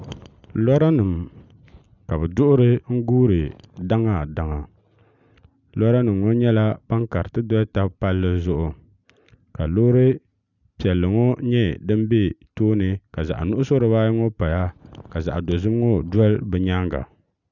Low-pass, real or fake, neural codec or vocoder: 7.2 kHz; real; none